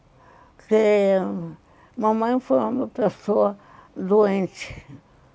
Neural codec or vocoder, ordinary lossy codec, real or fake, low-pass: none; none; real; none